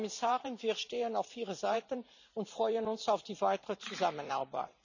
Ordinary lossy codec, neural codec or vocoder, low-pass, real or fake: none; vocoder, 44.1 kHz, 128 mel bands every 512 samples, BigVGAN v2; 7.2 kHz; fake